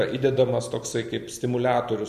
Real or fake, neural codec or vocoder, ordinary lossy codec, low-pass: real; none; MP3, 96 kbps; 14.4 kHz